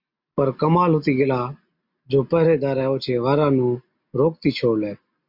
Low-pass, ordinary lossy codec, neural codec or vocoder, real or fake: 5.4 kHz; MP3, 48 kbps; none; real